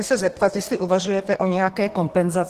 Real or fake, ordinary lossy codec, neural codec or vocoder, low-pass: fake; Opus, 16 kbps; codec, 32 kHz, 1.9 kbps, SNAC; 14.4 kHz